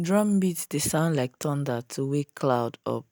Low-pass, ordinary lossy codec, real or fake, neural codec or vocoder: none; none; real; none